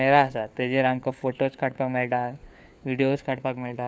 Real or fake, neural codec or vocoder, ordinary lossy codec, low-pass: fake; codec, 16 kHz, 4 kbps, FunCodec, trained on LibriTTS, 50 frames a second; none; none